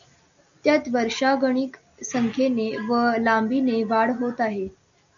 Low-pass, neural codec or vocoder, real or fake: 7.2 kHz; none; real